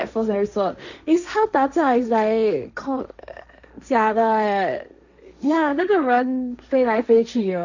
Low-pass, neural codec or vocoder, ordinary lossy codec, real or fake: 7.2 kHz; codec, 16 kHz, 1.1 kbps, Voila-Tokenizer; none; fake